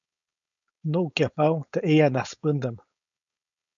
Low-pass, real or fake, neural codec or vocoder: 7.2 kHz; fake; codec, 16 kHz, 4.8 kbps, FACodec